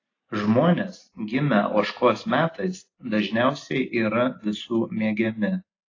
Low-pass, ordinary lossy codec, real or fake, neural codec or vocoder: 7.2 kHz; AAC, 32 kbps; real; none